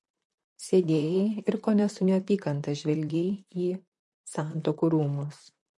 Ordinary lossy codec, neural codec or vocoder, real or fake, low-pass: MP3, 48 kbps; vocoder, 44.1 kHz, 128 mel bands, Pupu-Vocoder; fake; 10.8 kHz